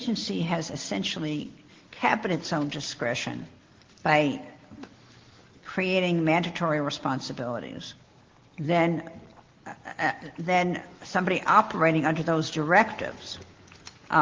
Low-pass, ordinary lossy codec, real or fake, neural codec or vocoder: 7.2 kHz; Opus, 16 kbps; real; none